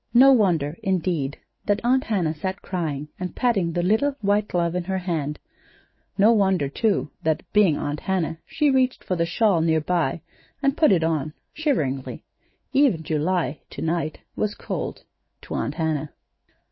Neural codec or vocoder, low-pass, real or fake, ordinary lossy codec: autoencoder, 48 kHz, 128 numbers a frame, DAC-VAE, trained on Japanese speech; 7.2 kHz; fake; MP3, 24 kbps